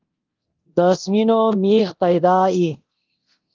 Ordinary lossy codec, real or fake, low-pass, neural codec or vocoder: Opus, 32 kbps; fake; 7.2 kHz; codec, 24 kHz, 0.9 kbps, DualCodec